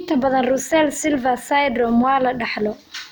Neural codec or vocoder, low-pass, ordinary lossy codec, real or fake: none; none; none; real